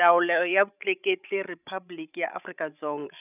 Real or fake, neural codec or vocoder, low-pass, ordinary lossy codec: fake; codec, 16 kHz, 16 kbps, FreqCodec, larger model; 3.6 kHz; none